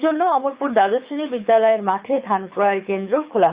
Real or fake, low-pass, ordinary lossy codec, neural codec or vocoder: fake; 3.6 kHz; Opus, 64 kbps; codec, 24 kHz, 6 kbps, HILCodec